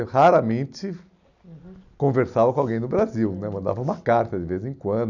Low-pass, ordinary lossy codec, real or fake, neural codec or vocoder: 7.2 kHz; none; real; none